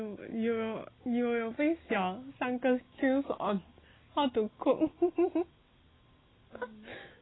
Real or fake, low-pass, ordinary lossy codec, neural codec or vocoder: real; 7.2 kHz; AAC, 16 kbps; none